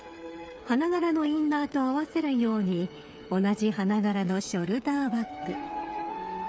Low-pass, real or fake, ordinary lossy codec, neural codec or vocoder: none; fake; none; codec, 16 kHz, 8 kbps, FreqCodec, smaller model